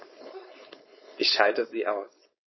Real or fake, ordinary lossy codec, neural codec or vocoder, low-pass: fake; MP3, 24 kbps; codec, 16 kHz, 4.8 kbps, FACodec; 7.2 kHz